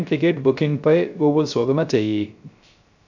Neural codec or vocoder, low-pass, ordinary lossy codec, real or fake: codec, 16 kHz, 0.3 kbps, FocalCodec; 7.2 kHz; none; fake